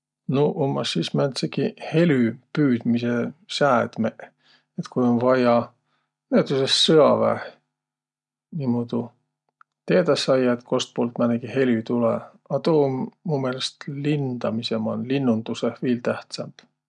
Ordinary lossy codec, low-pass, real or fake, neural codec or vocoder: none; 10.8 kHz; real; none